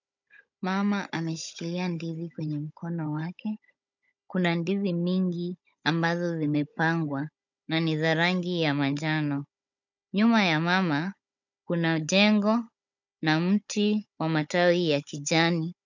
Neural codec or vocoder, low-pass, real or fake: codec, 16 kHz, 16 kbps, FunCodec, trained on Chinese and English, 50 frames a second; 7.2 kHz; fake